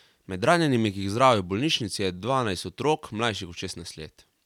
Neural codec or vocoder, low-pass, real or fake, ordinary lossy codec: vocoder, 44.1 kHz, 128 mel bands every 256 samples, BigVGAN v2; 19.8 kHz; fake; none